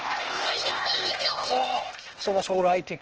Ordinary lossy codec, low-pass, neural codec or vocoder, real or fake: Opus, 16 kbps; 7.2 kHz; codec, 16 kHz, 0.8 kbps, ZipCodec; fake